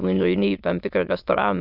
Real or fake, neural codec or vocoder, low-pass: fake; autoencoder, 22.05 kHz, a latent of 192 numbers a frame, VITS, trained on many speakers; 5.4 kHz